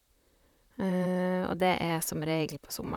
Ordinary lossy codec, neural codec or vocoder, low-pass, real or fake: none; vocoder, 44.1 kHz, 128 mel bands, Pupu-Vocoder; 19.8 kHz; fake